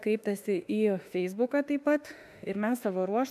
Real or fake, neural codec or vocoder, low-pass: fake; autoencoder, 48 kHz, 32 numbers a frame, DAC-VAE, trained on Japanese speech; 14.4 kHz